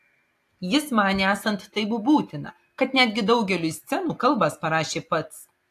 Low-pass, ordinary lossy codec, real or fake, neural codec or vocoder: 14.4 kHz; AAC, 64 kbps; real; none